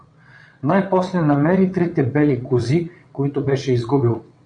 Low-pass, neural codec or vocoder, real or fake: 9.9 kHz; vocoder, 22.05 kHz, 80 mel bands, WaveNeXt; fake